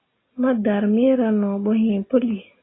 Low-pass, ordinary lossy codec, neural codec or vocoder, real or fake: 7.2 kHz; AAC, 16 kbps; none; real